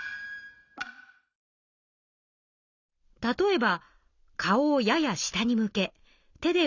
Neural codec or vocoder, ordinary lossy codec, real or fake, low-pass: none; none; real; 7.2 kHz